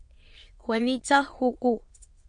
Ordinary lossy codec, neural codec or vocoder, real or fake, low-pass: MP3, 48 kbps; autoencoder, 22.05 kHz, a latent of 192 numbers a frame, VITS, trained on many speakers; fake; 9.9 kHz